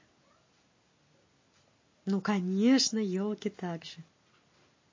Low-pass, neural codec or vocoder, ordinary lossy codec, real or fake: 7.2 kHz; none; MP3, 32 kbps; real